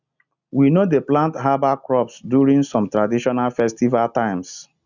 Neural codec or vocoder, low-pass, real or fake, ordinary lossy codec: none; 7.2 kHz; real; none